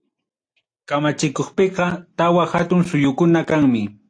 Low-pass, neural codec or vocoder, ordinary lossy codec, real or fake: 9.9 kHz; none; AAC, 48 kbps; real